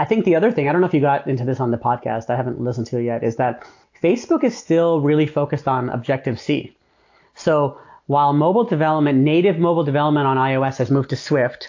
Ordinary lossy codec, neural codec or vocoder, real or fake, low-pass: AAC, 48 kbps; none; real; 7.2 kHz